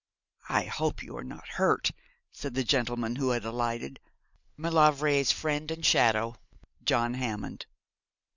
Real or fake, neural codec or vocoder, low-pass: real; none; 7.2 kHz